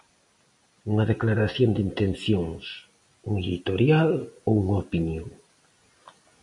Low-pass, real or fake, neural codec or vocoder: 10.8 kHz; real; none